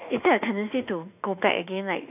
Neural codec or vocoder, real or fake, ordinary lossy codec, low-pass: autoencoder, 48 kHz, 32 numbers a frame, DAC-VAE, trained on Japanese speech; fake; none; 3.6 kHz